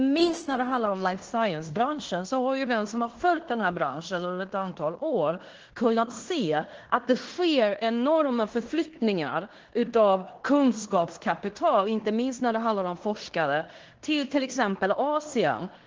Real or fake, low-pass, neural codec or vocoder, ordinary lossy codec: fake; 7.2 kHz; codec, 16 kHz in and 24 kHz out, 0.9 kbps, LongCat-Audio-Codec, fine tuned four codebook decoder; Opus, 16 kbps